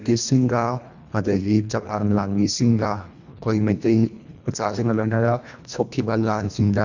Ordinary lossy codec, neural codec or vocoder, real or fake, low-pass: none; codec, 24 kHz, 1.5 kbps, HILCodec; fake; 7.2 kHz